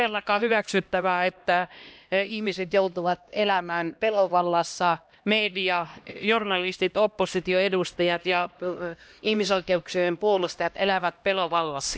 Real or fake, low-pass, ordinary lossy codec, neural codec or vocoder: fake; none; none; codec, 16 kHz, 1 kbps, X-Codec, HuBERT features, trained on LibriSpeech